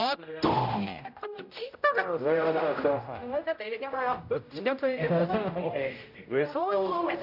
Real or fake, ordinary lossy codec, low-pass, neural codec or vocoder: fake; none; 5.4 kHz; codec, 16 kHz, 0.5 kbps, X-Codec, HuBERT features, trained on general audio